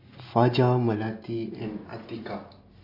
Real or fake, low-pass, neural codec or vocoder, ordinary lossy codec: real; 5.4 kHz; none; MP3, 32 kbps